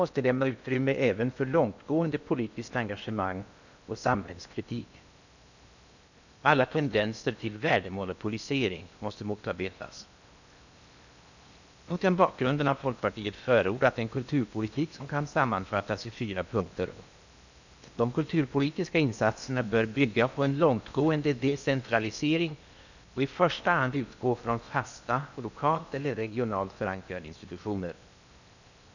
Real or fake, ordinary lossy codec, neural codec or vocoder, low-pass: fake; none; codec, 16 kHz in and 24 kHz out, 0.8 kbps, FocalCodec, streaming, 65536 codes; 7.2 kHz